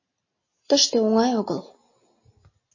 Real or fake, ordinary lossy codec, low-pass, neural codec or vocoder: real; MP3, 32 kbps; 7.2 kHz; none